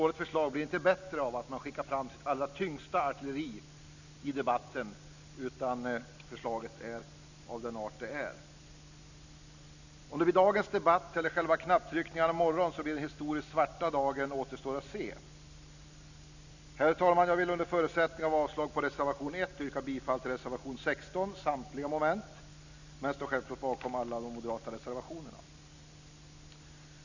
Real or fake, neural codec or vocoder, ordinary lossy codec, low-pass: real; none; none; 7.2 kHz